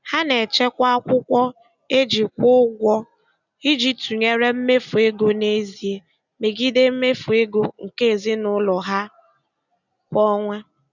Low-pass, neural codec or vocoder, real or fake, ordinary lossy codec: 7.2 kHz; none; real; none